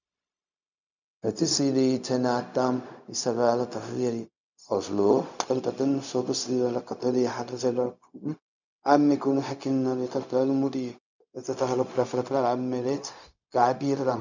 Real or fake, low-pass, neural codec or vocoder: fake; 7.2 kHz; codec, 16 kHz, 0.4 kbps, LongCat-Audio-Codec